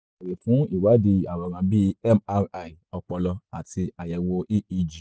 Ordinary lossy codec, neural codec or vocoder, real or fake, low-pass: none; none; real; none